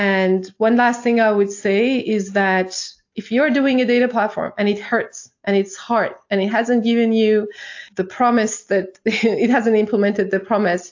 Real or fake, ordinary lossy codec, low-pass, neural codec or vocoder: real; AAC, 48 kbps; 7.2 kHz; none